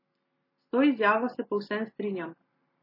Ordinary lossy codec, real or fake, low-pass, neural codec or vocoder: MP3, 24 kbps; real; 5.4 kHz; none